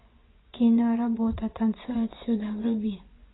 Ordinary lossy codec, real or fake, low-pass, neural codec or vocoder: AAC, 16 kbps; fake; 7.2 kHz; vocoder, 24 kHz, 100 mel bands, Vocos